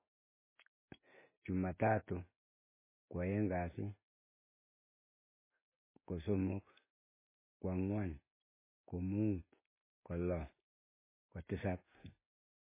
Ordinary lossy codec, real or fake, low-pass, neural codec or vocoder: MP3, 16 kbps; real; 3.6 kHz; none